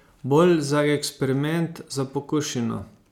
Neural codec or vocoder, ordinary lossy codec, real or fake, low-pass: none; none; real; 19.8 kHz